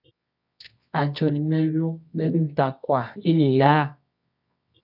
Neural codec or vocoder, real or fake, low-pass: codec, 24 kHz, 0.9 kbps, WavTokenizer, medium music audio release; fake; 5.4 kHz